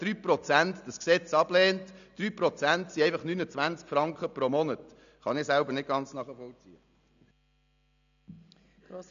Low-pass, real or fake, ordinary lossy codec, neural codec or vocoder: 7.2 kHz; real; none; none